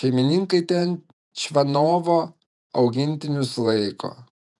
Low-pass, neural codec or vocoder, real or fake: 10.8 kHz; vocoder, 48 kHz, 128 mel bands, Vocos; fake